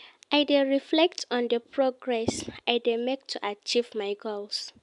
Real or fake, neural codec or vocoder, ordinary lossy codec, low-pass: real; none; MP3, 96 kbps; 10.8 kHz